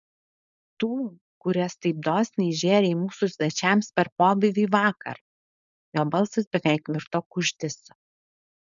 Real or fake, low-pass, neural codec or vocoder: fake; 7.2 kHz; codec, 16 kHz, 4.8 kbps, FACodec